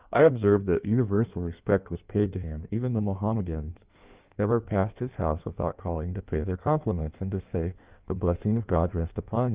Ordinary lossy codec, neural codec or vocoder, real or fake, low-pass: Opus, 24 kbps; codec, 16 kHz in and 24 kHz out, 1.1 kbps, FireRedTTS-2 codec; fake; 3.6 kHz